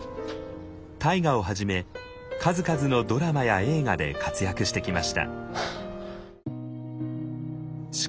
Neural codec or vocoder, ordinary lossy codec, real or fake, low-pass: none; none; real; none